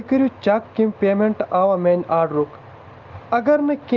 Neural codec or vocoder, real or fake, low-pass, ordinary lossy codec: none; real; 7.2 kHz; Opus, 24 kbps